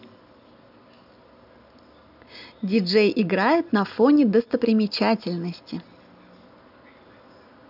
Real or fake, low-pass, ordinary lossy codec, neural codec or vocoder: real; 5.4 kHz; none; none